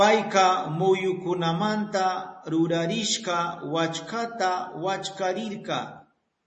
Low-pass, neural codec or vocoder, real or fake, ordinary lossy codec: 10.8 kHz; none; real; MP3, 32 kbps